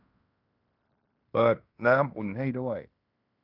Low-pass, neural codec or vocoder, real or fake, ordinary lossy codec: 5.4 kHz; codec, 16 kHz in and 24 kHz out, 0.9 kbps, LongCat-Audio-Codec, fine tuned four codebook decoder; fake; none